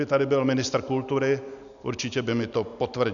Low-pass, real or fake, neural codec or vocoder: 7.2 kHz; real; none